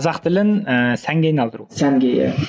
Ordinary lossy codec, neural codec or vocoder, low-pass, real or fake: none; none; none; real